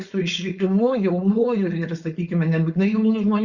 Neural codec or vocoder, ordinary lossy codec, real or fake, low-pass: codec, 16 kHz, 4.8 kbps, FACodec; Opus, 64 kbps; fake; 7.2 kHz